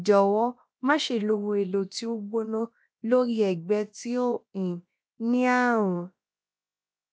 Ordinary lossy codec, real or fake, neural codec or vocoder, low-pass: none; fake; codec, 16 kHz, about 1 kbps, DyCAST, with the encoder's durations; none